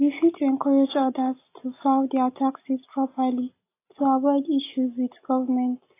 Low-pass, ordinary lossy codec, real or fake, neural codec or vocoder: 3.6 kHz; AAC, 24 kbps; real; none